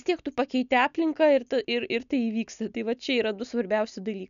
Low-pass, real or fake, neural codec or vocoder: 7.2 kHz; real; none